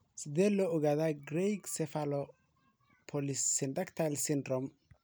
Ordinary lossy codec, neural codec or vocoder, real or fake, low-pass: none; none; real; none